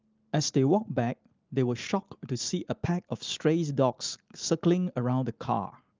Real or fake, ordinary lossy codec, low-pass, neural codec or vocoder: real; Opus, 32 kbps; 7.2 kHz; none